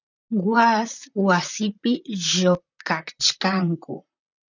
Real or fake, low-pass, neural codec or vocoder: fake; 7.2 kHz; vocoder, 44.1 kHz, 128 mel bands, Pupu-Vocoder